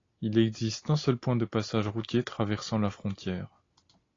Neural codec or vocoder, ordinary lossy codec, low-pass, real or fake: none; AAC, 32 kbps; 7.2 kHz; real